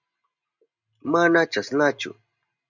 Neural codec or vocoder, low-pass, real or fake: none; 7.2 kHz; real